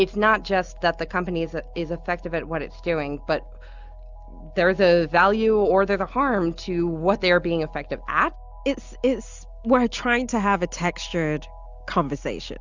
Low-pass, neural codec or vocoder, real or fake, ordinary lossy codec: 7.2 kHz; none; real; Opus, 64 kbps